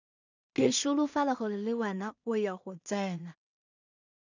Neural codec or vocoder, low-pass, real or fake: codec, 16 kHz in and 24 kHz out, 0.4 kbps, LongCat-Audio-Codec, two codebook decoder; 7.2 kHz; fake